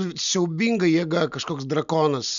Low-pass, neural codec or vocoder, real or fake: 7.2 kHz; none; real